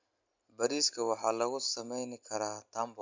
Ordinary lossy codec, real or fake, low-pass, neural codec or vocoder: MP3, 64 kbps; real; 7.2 kHz; none